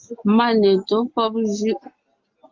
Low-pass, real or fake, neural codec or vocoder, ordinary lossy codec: 7.2 kHz; real; none; Opus, 32 kbps